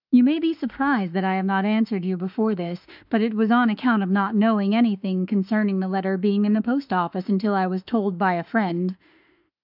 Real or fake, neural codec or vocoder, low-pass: fake; autoencoder, 48 kHz, 32 numbers a frame, DAC-VAE, trained on Japanese speech; 5.4 kHz